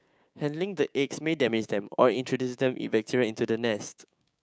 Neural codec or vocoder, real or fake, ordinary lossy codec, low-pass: codec, 16 kHz, 6 kbps, DAC; fake; none; none